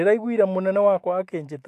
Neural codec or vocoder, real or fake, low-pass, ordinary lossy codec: autoencoder, 48 kHz, 128 numbers a frame, DAC-VAE, trained on Japanese speech; fake; 14.4 kHz; AAC, 64 kbps